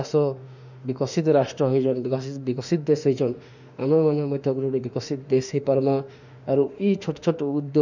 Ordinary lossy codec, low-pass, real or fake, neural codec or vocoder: none; 7.2 kHz; fake; autoencoder, 48 kHz, 32 numbers a frame, DAC-VAE, trained on Japanese speech